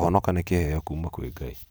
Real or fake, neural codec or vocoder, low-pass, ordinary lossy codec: real; none; none; none